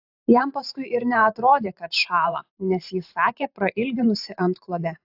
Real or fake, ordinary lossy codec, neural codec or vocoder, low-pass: fake; AAC, 48 kbps; vocoder, 44.1 kHz, 128 mel bands every 512 samples, BigVGAN v2; 5.4 kHz